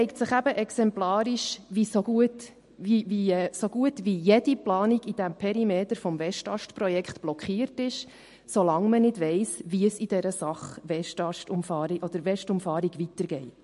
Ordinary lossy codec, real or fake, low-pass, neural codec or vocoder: MP3, 48 kbps; real; 14.4 kHz; none